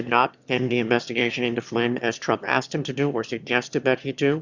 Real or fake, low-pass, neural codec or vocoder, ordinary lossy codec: fake; 7.2 kHz; autoencoder, 22.05 kHz, a latent of 192 numbers a frame, VITS, trained on one speaker; Opus, 64 kbps